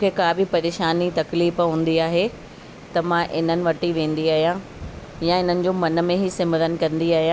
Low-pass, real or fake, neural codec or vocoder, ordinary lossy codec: none; real; none; none